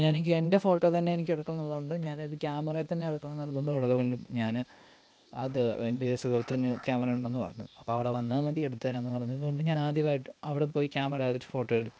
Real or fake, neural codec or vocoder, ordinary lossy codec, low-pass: fake; codec, 16 kHz, 0.8 kbps, ZipCodec; none; none